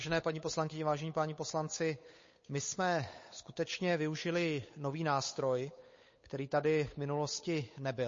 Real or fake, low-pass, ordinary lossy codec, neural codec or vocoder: fake; 7.2 kHz; MP3, 32 kbps; codec, 16 kHz, 8 kbps, FunCodec, trained on Chinese and English, 25 frames a second